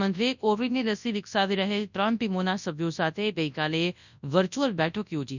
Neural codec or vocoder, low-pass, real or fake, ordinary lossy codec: codec, 24 kHz, 0.9 kbps, WavTokenizer, large speech release; 7.2 kHz; fake; none